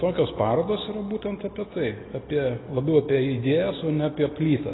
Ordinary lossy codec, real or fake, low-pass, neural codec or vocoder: AAC, 16 kbps; real; 7.2 kHz; none